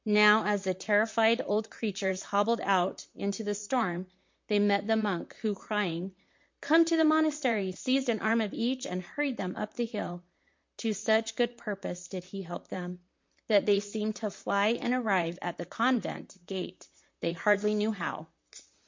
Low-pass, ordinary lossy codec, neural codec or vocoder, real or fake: 7.2 kHz; MP3, 48 kbps; vocoder, 44.1 kHz, 128 mel bands, Pupu-Vocoder; fake